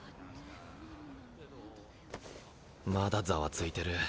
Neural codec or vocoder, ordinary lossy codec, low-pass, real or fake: none; none; none; real